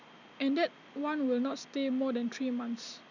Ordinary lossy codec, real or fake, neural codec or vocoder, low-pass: none; real; none; 7.2 kHz